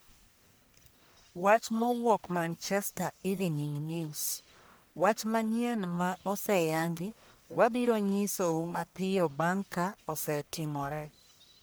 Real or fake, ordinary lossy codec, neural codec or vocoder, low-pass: fake; none; codec, 44.1 kHz, 1.7 kbps, Pupu-Codec; none